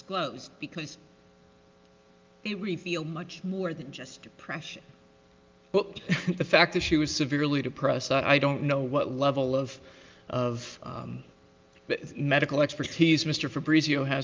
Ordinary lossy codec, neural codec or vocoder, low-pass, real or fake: Opus, 24 kbps; none; 7.2 kHz; real